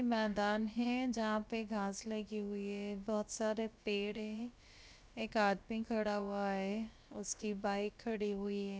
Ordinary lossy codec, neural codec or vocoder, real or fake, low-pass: none; codec, 16 kHz, about 1 kbps, DyCAST, with the encoder's durations; fake; none